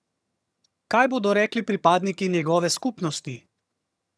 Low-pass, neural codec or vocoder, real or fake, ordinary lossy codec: none; vocoder, 22.05 kHz, 80 mel bands, HiFi-GAN; fake; none